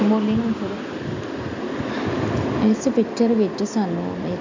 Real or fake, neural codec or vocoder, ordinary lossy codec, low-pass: real; none; MP3, 64 kbps; 7.2 kHz